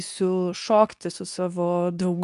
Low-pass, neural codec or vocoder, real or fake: 10.8 kHz; codec, 24 kHz, 0.9 kbps, WavTokenizer, medium speech release version 2; fake